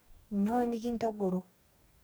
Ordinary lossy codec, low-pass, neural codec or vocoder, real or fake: none; none; codec, 44.1 kHz, 2.6 kbps, DAC; fake